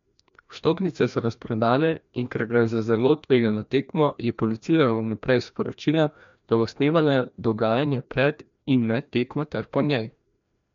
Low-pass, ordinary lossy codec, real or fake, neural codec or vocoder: 7.2 kHz; MP3, 64 kbps; fake; codec, 16 kHz, 1 kbps, FreqCodec, larger model